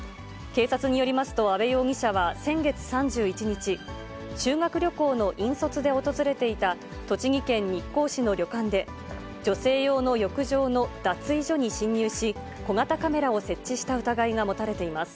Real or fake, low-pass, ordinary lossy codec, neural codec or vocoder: real; none; none; none